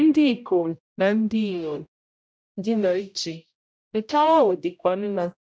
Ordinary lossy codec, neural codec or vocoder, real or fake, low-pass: none; codec, 16 kHz, 0.5 kbps, X-Codec, HuBERT features, trained on general audio; fake; none